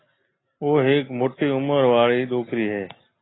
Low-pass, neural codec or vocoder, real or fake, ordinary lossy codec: 7.2 kHz; none; real; AAC, 16 kbps